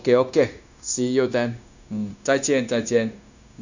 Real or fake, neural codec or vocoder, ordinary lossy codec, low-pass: fake; codec, 16 kHz, 0.9 kbps, LongCat-Audio-Codec; none; 7.2 kHz